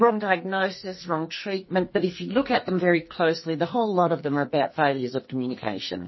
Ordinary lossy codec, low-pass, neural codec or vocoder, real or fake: MP3, 24 kbps; 7.2 kHz; codec, 44.1 kHz, 2.6 kbps, SNAC; fake